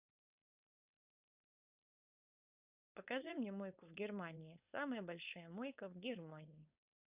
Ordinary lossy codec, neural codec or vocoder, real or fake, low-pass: Opus, 64 kbps; codec, 16 kHz, 4.8 kbps, FACodec; fake; 3.6 kHz